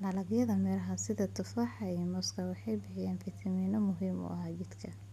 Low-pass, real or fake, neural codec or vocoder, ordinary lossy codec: 14.4 kHz; real; none; none